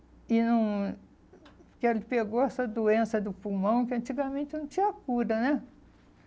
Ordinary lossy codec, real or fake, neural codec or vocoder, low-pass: none; real; none; none